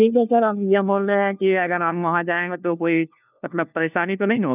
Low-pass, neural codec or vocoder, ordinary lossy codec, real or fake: 3.6 kHz; codec, 16 kHz, 1 kbps, FunCodec, trained on LibriTTS, 50 frames a second; none; fake